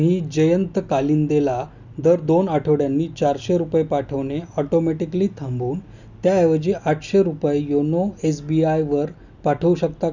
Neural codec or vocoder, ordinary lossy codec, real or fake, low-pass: none; none; real; 7.2 kHz